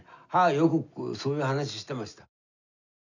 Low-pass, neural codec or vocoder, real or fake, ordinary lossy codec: 7.2 kHz; none; real; none